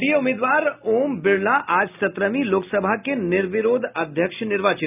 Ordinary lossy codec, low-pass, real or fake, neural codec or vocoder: none; 3.6 kHz; real; none